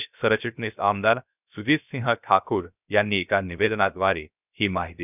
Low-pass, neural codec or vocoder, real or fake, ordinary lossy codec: 3.6 kHz; codec, 16 kHz, 0.3 kbps, FocalCodec; fake; none